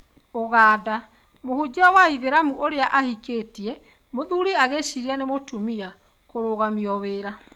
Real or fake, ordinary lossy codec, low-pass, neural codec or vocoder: fake; none; 19.8 kHz; codec, 44.1 kHz, 7.8 kbps, DAC